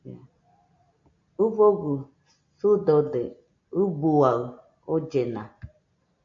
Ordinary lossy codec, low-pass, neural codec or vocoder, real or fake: MP3, 48 kbps; 7.2 kHz; none; real